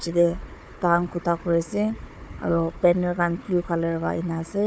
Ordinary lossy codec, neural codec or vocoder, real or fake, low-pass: none; codec, 16 kHz, 4 kbps, FunCodec, trained on Chinese and English, 50 frames a second; fake; none